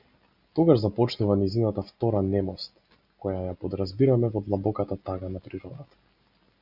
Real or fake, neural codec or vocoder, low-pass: real; none; 5.4 kHz